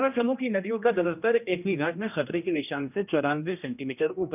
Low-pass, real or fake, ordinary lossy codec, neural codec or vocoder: 3.6 kHz; fake; none; codec, 16 kHz, 1 kbps, X-Codec, HuBERT features, trained on general audio